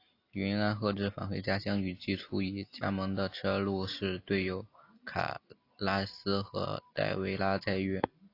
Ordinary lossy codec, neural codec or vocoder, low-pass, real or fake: AAC, 32 kbps; none; 5.4 kHz; real